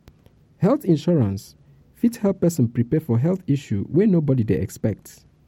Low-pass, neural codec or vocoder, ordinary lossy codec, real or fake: 19.8 kHz; none; MP3, 64 kbps; real